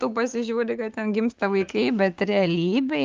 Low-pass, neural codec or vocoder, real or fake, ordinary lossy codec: 7.2 kHz; codec, 16 kHz, 6 kbps, DAC; fake; Opus, 24 kbps